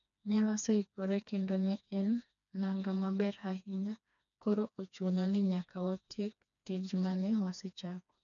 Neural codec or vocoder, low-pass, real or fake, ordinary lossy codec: codec, 16 kHz, 2 kbps, FreqCodec, smaller model; 7.2 kHz; fake; none